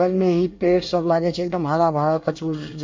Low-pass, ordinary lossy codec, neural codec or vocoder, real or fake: 7.2 kHz; MP3, 48 kbps; codec, 24 kHz, 1 kbps, SNAC; fake